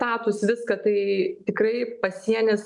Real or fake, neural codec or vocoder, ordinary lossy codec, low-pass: real; none; MP3, 96 kbps; 10.8 kHz